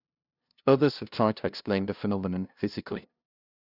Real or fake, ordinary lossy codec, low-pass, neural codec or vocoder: fake; none; 5.4 kHz; codec, 16 kHz, 0.5 kbps, FunCodec, trained on LibriTTS, 25 frames a second